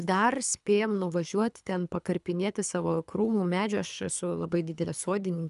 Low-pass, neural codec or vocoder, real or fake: 10.8 kHz; codec, 24 kHz, 3 kbps, HILCodec; fake